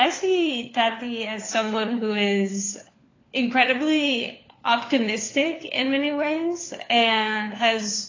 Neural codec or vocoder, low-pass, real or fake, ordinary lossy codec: codec, 16 kHz, 4 kbps, FunCodec, trained on LibriTTS, 50 frames a second; 7.2 kHz; fake; AAC, 32 kbps